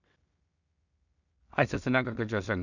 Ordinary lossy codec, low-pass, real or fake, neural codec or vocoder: none; 7.2 kHz; fake; codec, 16 kHz in and 24 kHz out, 0.4 kbps, LongCat-Audio-Codec, two codebook decoder